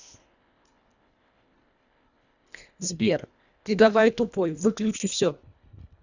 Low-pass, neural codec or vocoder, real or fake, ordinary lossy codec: 7.2 kHz; codec, 24 kHz, 1.5 kbps, HILCodec; fake; none